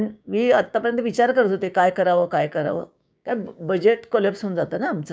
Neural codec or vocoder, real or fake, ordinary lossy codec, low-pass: codec, 16 kHz, 6 kbps, DAC; fake; none; none